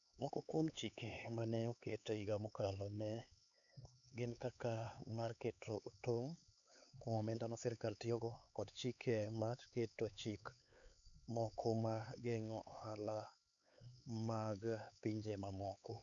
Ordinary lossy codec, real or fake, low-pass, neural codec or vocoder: none; fake; 7.2 kHz; codec, 16 kHz, 4 kbps, X-Codec, HuBERT features, trained on LibriSpeech